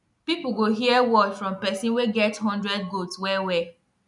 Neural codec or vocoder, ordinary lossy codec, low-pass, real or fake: none; none; 10.8 kHz; real